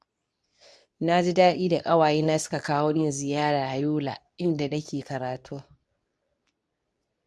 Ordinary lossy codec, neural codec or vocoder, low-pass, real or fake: none; codec, 24 kHz, 0.9 kbps, WavTokenizer, medium speech release version 2; none; fake